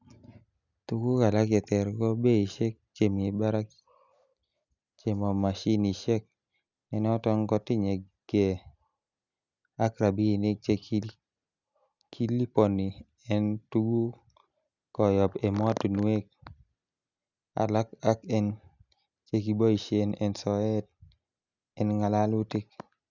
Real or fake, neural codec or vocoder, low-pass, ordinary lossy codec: real; none; 7.2 kHz; none